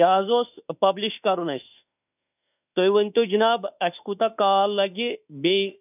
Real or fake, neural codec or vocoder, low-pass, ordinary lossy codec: fake; autoencoder, 48 kHz, 32 numbers a frame, DAC-VAE, trained on Japanese speech; 3.6 kHz; none